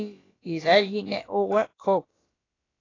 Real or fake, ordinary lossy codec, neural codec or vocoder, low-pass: fake; AAC, 32 kbps; codec, 16 kHz, about 1 kbps, DyCAST, with the encoder's durations; 7.2 kHz